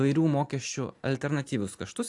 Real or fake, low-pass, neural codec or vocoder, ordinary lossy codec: real; 10.8 kHz; none; AAC, 64 kbps